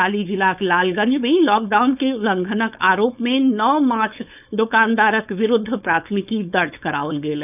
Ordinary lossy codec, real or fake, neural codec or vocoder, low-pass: none; fake; codec, 16 kHz, 4.8 kbps, FACodec; 3.6 kHz